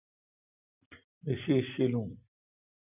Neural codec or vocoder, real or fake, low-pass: none; real; 3.6 kHz